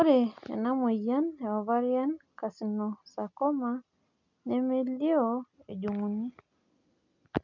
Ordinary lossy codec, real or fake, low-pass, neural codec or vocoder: none; real; 7.2 kHz; none